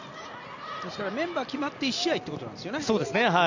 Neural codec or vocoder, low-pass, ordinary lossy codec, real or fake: none; 7.2 kHz; none; real